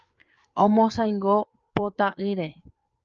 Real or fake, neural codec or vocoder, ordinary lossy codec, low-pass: fake; codec, 16 kHz, 4 kbps, FreqCodec, larger model; Opus, 24 kbps; 7.2 kHz